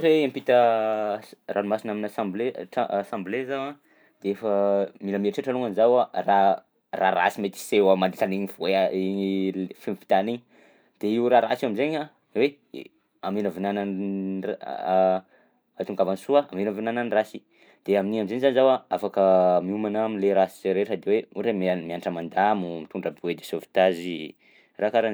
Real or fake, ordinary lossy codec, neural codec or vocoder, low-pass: real; none; none; none